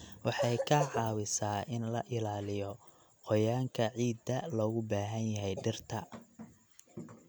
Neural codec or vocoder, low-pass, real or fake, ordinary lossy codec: none; none; real; none